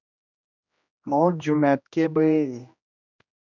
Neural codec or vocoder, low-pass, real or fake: codec, 16 kHz, 1 kbps, X-Codec, HuBERT features, trained on general audio; 7.2 kHz; fake